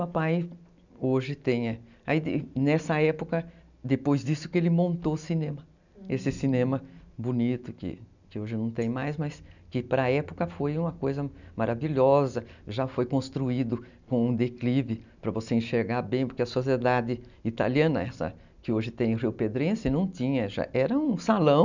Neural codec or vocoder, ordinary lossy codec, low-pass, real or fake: none; none; 7.2 kHz; real